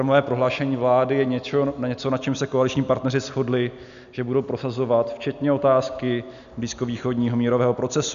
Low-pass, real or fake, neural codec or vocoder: 7.2 kHz; real; none